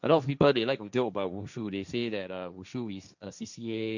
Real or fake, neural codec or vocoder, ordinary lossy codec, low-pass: fake; codec, 16 kHz, 1.1 kbps, Voila-Tokenizer; none; 7.2 kHz